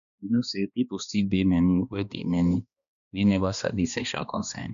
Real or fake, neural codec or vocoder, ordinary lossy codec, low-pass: fake; codec, 16 kHz, 2 kbps, X-Codec, HuBERT features, trained on balanced general audio; none; 7.2 kHz